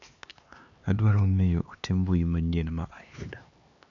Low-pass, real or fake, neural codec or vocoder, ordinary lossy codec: 7.2 kHz; fake; codec, 16 kHz, 2 kbps, X-Codec, HuBERT features, trained on LibriSpeech; AAC, 64 kbps